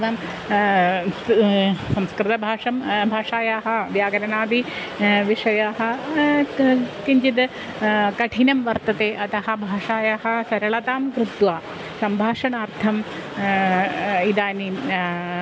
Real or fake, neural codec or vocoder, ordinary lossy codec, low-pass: real; none; none; none